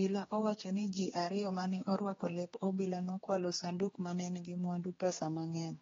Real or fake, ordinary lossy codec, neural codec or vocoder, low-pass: fake; AAC, 24 kbps; codec, 16 kHz, 2 kbps, X-Codec, HuBERT features, trained on general audio; 7.2 kHz